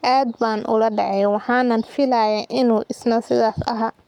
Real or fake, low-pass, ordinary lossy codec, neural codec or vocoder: fake; 19.8 kHz; none; codec, 44.1 kHz, 7.8 kbps, Pupu-Codec